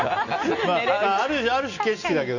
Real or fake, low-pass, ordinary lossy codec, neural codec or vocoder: real; 7.2 kHz; none; none